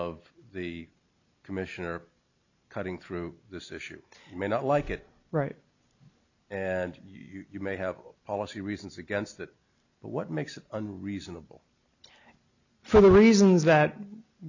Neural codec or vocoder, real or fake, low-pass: none; real; 7.2 kHz